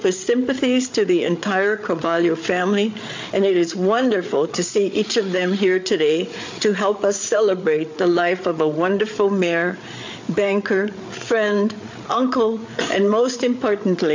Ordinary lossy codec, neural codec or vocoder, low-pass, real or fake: MP3, 48 kbps; codec, 16 kHz, 8 kbps, FreqCodec, larger model; 7.2 kHz; fake